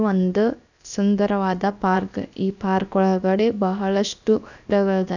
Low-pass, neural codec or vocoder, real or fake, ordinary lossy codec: 7.2 kHz; codec, 16 kHz, about 1 kbps, DyCAST, with the encoder's durations; fake; none